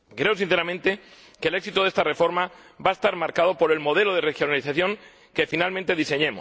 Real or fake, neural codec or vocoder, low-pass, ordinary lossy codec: real; none; none; none